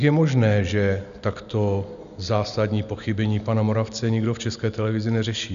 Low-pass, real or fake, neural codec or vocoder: 7.2 kHz; real; none